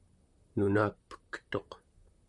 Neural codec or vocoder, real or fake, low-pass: vocoder, 44.1 kHz, 128 mel bands, Pupu-Vocoder; fake; 10.8 kHz